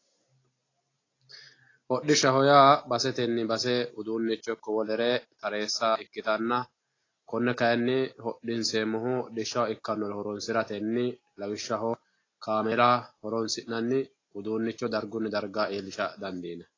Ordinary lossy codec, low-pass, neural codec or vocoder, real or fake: AAC, 32 kbps; 7.2 kHz; none; real